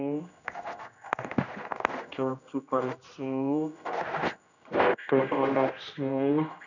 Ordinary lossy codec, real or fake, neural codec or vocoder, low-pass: none; fake; codec, 16 kHz, 1 kbps, X-Codec, HuBERT features, trained on general audio; 7.2 kHz